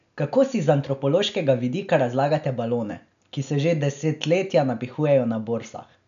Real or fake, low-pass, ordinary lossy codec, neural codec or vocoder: real; 7.2 kHz; none; none